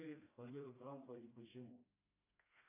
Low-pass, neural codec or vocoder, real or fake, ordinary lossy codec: 3.6 kHz; codec, 16 kHz, 1 kbps, FreqCodec, smaller model; fake; AAC, 16 kbps